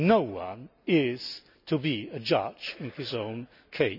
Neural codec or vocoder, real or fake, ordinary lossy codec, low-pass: none; real; none; 5.4 kHz